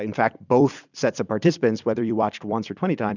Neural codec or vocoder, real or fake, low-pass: vocoder, 44.1 kHz, 128 mel bands every 256 samples, BigVGAN v2; fake; 7.2 kHz